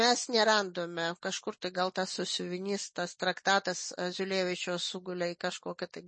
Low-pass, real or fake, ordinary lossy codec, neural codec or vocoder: 9.9 kHz; real; MP3, 32 kbps; none